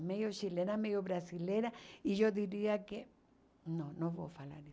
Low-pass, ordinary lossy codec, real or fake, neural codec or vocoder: none; none; real; none